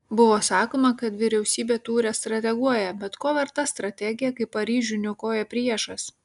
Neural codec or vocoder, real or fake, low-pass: none; real; 10.8 kHz